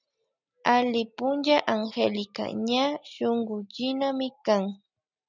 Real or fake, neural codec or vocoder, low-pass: real; none; 7.2 kHz